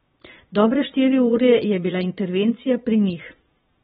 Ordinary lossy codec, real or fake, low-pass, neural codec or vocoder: AAC, 16 kbps; fake; 9.9 kHz; vocoder, 22.05 kHz, 80 mel bands, Vocos